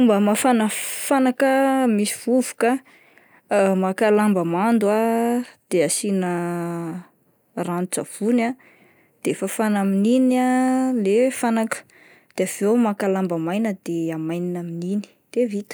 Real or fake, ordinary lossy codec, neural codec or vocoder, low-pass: real; none; none; none